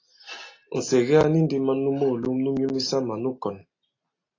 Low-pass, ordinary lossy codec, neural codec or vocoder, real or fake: 7.2 kHz; AAC, 32 kbps; none; real